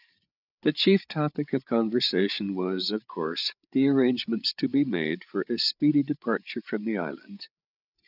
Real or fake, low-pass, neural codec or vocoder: fake; 5.4 kHz; codec, 16 kHz, 8 kbps, FreqCodec, larger model